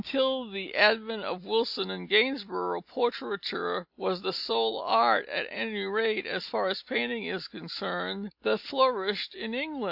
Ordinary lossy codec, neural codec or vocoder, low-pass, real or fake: AAC, 48 kbps; none; 5.4 kHz; real